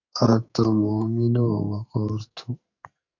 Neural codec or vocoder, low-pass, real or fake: codec, 44.1 kHz, 2.6 kbps, SNAC; 7.2 kHz; fake